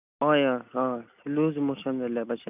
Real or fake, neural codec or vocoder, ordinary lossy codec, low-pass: real; none; none; 3.6 kHz